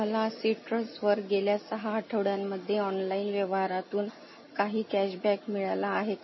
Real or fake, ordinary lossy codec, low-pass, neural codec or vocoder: real; MP3, 24 kbps; 7.2 kHz; none